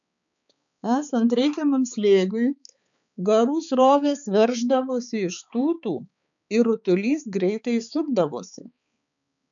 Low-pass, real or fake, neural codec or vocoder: 7.2 kHz; fake; codec, 16 kHz, 4 kbps, X-Codec, HuBERT features, trained on balanced general audio